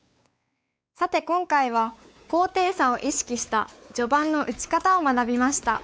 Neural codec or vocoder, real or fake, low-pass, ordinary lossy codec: codec, 16 kHz, 4 kbps, X-Codec, WavLM features, trained on Multilingual LibriSpeech; fake; none; none